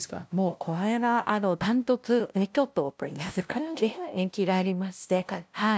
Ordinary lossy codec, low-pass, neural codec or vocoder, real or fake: none; none; codec, 16 kHz, 0.5 kbps, FunCodec, trained on LibriTTS, 25 frames a second; fake